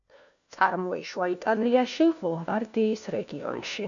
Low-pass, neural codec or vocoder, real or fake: 7.2 kHz; codec, 16 kHz, 1 kbps, FunCodec, trained on LibriTTS, 50 frames a second; fake